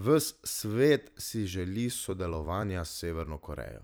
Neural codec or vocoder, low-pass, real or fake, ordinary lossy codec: vocoder, 44.1 kHz, 128 mel bands every 256 samples, BigVGAN v2; none; fake; none